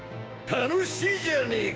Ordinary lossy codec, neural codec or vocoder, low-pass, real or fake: none; codec, 16 kHz, 6 kbps, DAC; none; fake